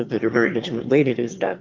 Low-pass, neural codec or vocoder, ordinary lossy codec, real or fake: 7.2 kHz; autoencoder, 22.05 kHz, a latent of 192 numbers a frame, VITS, trained on one speaker; Opus, 24 kbps; fake